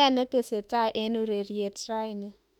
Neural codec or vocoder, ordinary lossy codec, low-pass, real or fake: autoencoder, 48 kHz, 32 numbers a frame, DAC-VAE, trained on Japanese speech; none; 19.8 kHz; fake